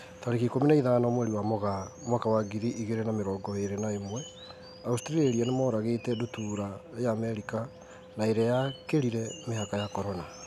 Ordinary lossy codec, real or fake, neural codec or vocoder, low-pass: none; real; none; 14.4 kHz